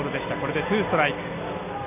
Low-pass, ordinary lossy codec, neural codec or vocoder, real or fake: 3.6 kHz; none; none; real